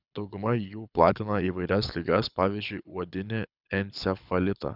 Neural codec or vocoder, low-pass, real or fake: codec, 24 kHz, 6 kbps, HILCodec; 5.4 kHz; fake